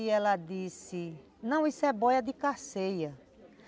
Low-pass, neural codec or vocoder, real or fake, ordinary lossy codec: none; none; real; none